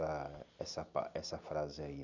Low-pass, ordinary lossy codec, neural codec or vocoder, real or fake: 7.2 kHz; none; none; real